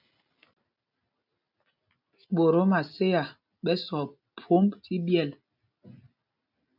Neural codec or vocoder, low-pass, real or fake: none; 5.4 kHz; real